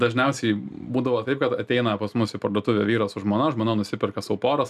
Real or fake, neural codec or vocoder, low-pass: real; none; 14.4 kHz